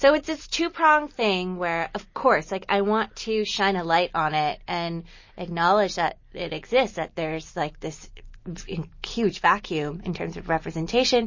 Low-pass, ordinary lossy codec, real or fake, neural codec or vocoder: 7.2 kHz; MP3, 32 kbps; real; none